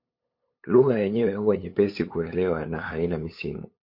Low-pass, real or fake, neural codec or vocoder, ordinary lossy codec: 5.4 kHz; fake; codec, 16 kHz, 8 kbps, FunCodec, trained on LibriTTS, 25 frames a second; MP3, 32 kbps